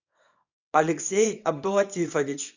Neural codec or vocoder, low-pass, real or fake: codec, 24 kHz, 0.9 kbps, WavTokenizer, small release; 7.2 kHz; fake